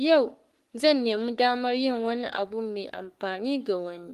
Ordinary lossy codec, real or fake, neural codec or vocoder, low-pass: Opus, 24 kbps; fake; codec, 44.1 kHz, 3.4 kbps, Pupu-Codec; 14.4 kHz